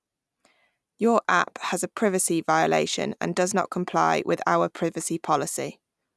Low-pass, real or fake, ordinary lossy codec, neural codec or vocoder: none; real; none; none